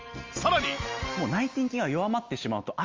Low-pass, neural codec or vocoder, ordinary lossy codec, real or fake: 7.2 kHz; none; Opus, 32 kbps; real